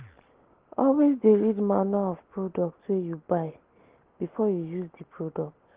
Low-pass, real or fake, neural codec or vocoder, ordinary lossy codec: 3.6 kHz; real; none; Opus, 16 kbps